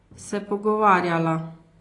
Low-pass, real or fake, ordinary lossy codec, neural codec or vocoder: 10.8 kHz; real; AAC, 32 kbps; none